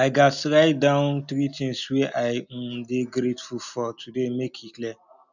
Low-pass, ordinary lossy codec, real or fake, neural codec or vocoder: 7.2 kHz; none; real; none